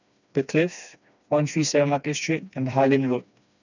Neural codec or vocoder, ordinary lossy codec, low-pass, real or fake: codec, 16 kHz, 2 kbps, FreqCodec, smaller model; none; 7.2 kHz; fake